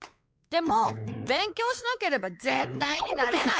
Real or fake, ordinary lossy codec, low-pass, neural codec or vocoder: fake; none; none; codec, 16 kHz, 4 kbps, X-Codec, WavLM features, trained on Multilingual LibriSpeech